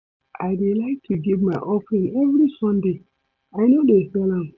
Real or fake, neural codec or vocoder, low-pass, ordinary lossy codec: real; none; 7.2 kHz; none